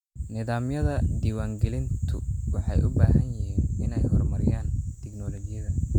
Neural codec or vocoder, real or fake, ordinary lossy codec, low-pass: none; real; none; 19.8 kHz